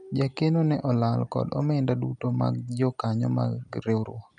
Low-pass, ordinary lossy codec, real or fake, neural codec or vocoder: 9.9 kHz; none; real; none